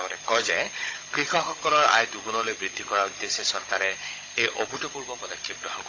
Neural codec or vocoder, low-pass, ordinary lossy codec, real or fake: codec, 16 kHz, 16 kbps, FunCodec, trained on Chinese and English, 50 frames a second; 7.2 kHz; AAC, 32 kbps; fake